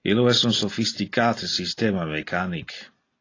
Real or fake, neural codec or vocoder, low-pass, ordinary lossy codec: real; none; 7.2 kHz; AAC, 32 kbps